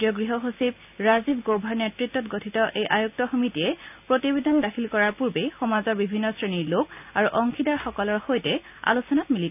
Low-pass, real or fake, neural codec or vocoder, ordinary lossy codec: 3.6 kHz; real; none; none